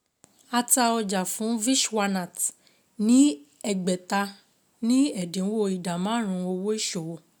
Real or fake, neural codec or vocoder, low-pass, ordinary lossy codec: real; none; none; none